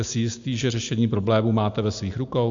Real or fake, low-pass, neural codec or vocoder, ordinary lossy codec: real; 7.2 kHz; none; AAC, 64 kbps